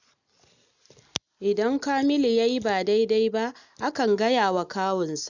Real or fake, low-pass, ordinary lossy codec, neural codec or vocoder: real; 7.2 kHz; none; none